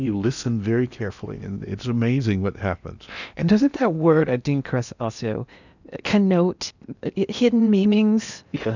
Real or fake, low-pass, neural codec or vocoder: fake; 7.2 kHz; codec, 16 kHz in and 24 kHz out, 0.8 kbps, FocalCodec, streaming, 65536 codes